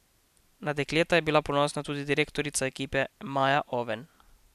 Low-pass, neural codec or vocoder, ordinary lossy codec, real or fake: 14.4 kHz; none; none; real